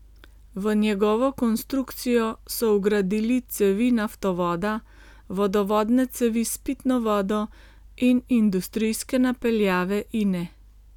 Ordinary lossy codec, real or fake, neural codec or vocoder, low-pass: none; real; none; 19.8 kHz